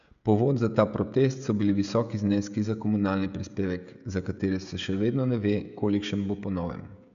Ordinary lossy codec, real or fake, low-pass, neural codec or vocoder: none; fake; 7.2 kHz; codec, 16 kHz, 16 kbps, FreqCodec, smaller model